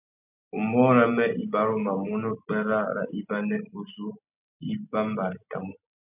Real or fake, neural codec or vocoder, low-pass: real; none; 3.6 kHz